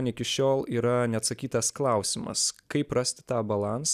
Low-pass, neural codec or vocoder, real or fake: 14.4 kHz; none; real